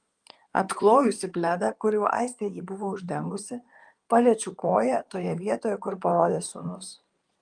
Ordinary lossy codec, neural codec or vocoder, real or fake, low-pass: Opus, 32 kbps; codec, 16 kHz in and 24 kHz out, 2.2 kbps, FireRedTTS-2 codec; fake; 9.9 kHz